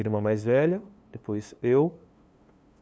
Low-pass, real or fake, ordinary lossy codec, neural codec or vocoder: none; fake; none; codec, 16 kHz, 2 kbps, FunCodec, trained on LibriTTS, 25 frames a second